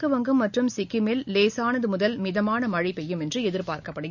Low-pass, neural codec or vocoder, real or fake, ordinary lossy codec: 7.2 kHz; none; real; none